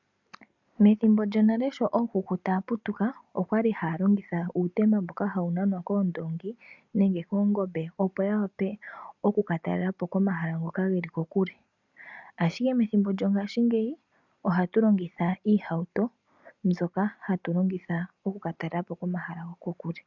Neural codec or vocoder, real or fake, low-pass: none; real; 7.2 kHz